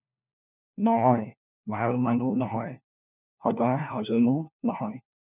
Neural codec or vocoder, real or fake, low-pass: codec, 16 kHz, 1 kbps, FunCodec, trained on LibriTTS, 50 frames a second; fake; 3.6 kHz